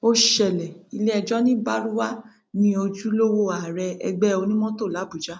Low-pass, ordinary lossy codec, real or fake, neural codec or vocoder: none; none; real; none